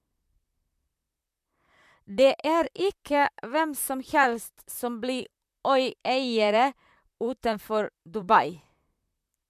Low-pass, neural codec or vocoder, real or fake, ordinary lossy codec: 14.4 kHz; vocoder, 44.1 kHz, 128 mel bands, Pupu-Vocoder; fake; MP3, 96 kbps